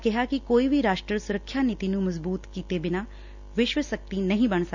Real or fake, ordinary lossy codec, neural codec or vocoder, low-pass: real; none; none; 7.2 kHz